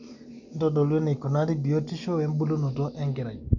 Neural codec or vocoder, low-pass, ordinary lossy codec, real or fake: none; 7.2 kHz; none; real